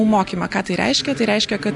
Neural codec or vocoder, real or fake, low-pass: none; real; 10.8 kHz